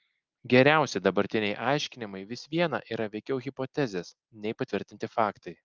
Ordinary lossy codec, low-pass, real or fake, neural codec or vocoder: Opus, 24 kbps; 7.2 kHz; real; none